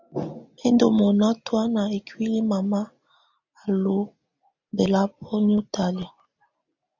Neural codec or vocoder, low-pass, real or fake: none; 7.2 kHz; real